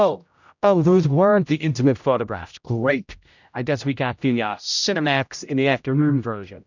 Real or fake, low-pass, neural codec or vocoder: fake; 7.2 kHz; codec, 16 kHz, 0.5 kbps, X-Codec, HuBERT features, trained on general audio